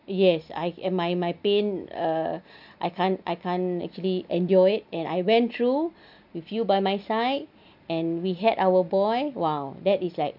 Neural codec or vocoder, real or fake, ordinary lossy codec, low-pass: none; real; none; 5.4 kHz